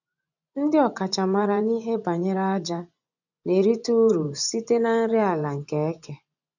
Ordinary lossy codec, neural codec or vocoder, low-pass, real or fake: none; vocoder, 24 kHz, 100 mel bands, Vocos; 7.2 kHz; fake